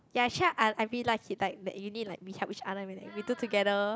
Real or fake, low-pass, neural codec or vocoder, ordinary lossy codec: real; none; none; none